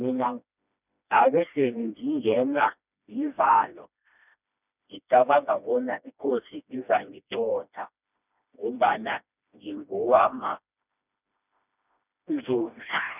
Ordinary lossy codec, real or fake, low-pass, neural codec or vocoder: none; fake; 3.6 kHz; codec, 16 kHz, 1 kbps, FreqCodec, smaller model